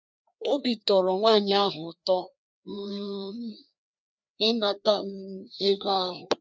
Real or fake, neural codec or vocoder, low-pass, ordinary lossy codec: fake; codec, 16 kHz, 2 kbps, FreqCodec, larger model; none; none